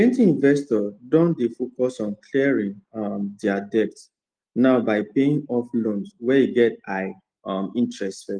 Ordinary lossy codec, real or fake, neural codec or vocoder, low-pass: Opus, 24 kbps; real; none; 9.9 kHz